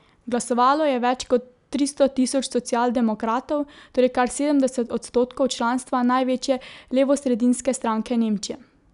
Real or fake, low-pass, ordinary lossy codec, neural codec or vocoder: real; 10.8 kHz; none; none